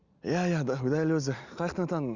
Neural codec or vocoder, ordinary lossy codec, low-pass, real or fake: none; Opus, 64 kbps; 7.2 kHz; real